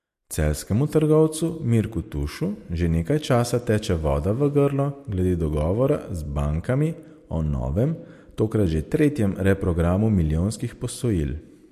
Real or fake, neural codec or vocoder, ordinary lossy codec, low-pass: real; none; MP3, 64 kbps; 14.4 kHz